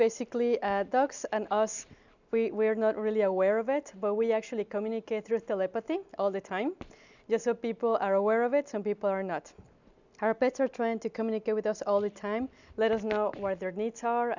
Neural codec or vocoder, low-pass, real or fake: none; 7.2 kHz; real